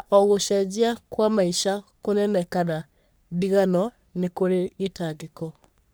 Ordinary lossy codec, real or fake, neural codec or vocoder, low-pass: none; fake; codec, 44.1 kHz, 3.4 kbps, Pupu-Codec; none